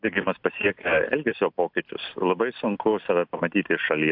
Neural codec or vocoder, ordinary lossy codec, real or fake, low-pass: none; AAC, 48 kbps; real; 5.4 kHz